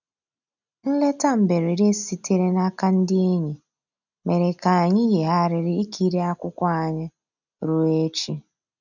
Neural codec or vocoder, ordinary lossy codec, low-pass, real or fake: none; none; 7.2 kHz; real